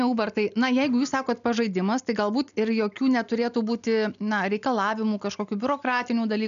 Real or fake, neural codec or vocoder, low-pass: real; none; 7.2 kHz